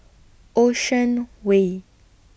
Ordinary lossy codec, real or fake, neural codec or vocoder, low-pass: none; real; none; none